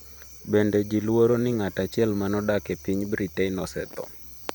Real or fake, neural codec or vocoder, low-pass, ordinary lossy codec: fake; vocoder, 44.1 kHz, 128 mel bands every 512 samples, BigVGAN v2; none; none